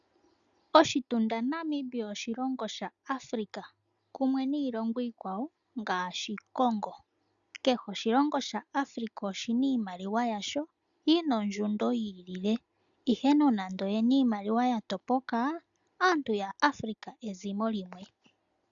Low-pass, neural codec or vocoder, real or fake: 7.2 kHz; none; real